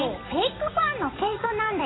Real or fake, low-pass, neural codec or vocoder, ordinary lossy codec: fake; 7.2 kHz; vocoder, 44.1 kHz, 128 mel bands every 256 samples, BigVGAN v2; AAC, 16 kbps